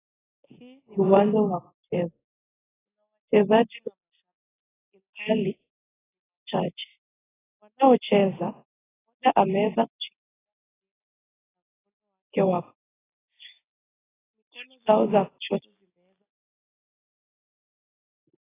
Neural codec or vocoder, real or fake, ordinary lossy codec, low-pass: none; real; AAC, 16 kbps; 3.6 kHz